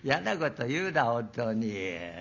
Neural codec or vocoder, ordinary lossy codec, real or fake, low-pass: none; none; real; 7.2 kHz